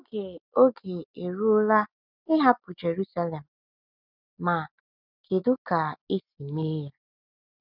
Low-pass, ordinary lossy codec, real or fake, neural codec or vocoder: 5.4 kHz; none; real; none